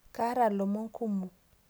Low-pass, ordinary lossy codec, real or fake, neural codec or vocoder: none; none; real; none